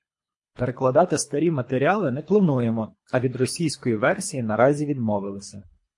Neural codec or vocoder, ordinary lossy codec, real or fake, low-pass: codec, 24 kHz, 3 kbps, HILCodec; MP3, 48 kbps; fake; 10.8 kHz